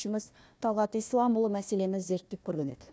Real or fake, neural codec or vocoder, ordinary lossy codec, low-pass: fake; codec, 16 kHz, 1 kbps, FunCodec, trained on Chinese and English, 50 frames a second; none; none